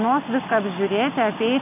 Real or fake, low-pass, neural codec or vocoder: real; 3.6 kHz; none